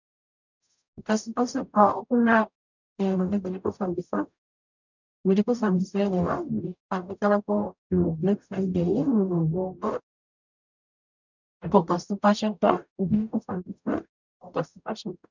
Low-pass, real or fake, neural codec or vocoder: 7.2 kHz; fake; codec, 44.1 kHz, 0.9 kbps, DAC